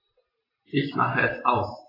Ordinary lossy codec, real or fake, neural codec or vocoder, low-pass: AAC, 24 kbps; real; none; 5.4 kHz